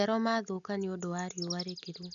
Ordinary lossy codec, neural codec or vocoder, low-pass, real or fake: none; none; 7.2 kHz; real